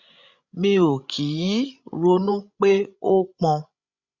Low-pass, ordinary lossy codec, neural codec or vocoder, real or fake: 7.2 kHz; Opus, 64 kbps; codec, 16 kHz, 16 kbps, FreqCodec, larger model; fake